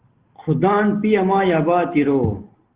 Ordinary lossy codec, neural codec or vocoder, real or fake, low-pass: Opus, 16 kbps; none; real; 3.6 kHz